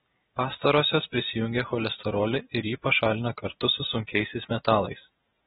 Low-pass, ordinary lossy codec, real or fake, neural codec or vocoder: 19.8 kHz; AAC, 16 kbps; real; none